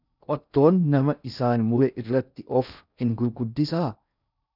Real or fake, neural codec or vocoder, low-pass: fake; codec, 16 kHz in and 24 kHz out, 0.6 kbps, FocalCodec, streaming, 4096 codes; 5.4 kHz